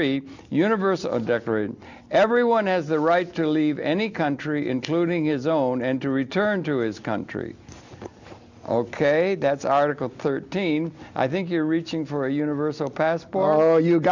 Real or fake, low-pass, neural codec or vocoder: real; 7.2 kHz; none